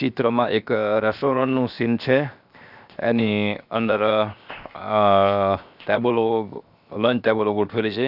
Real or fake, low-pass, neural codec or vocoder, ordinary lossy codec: fake; 5.4 kHz; codec, 16 kHz, 0.7 kbps, FocalCodec; none